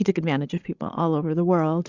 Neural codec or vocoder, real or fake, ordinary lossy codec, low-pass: codec, 16 kHz, 16 kbps, FreqCodec, larger model; fake; Opus, 64 kbps; 7.2 kHz